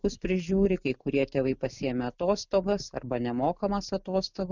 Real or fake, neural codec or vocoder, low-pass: real; none; 7.2 kHz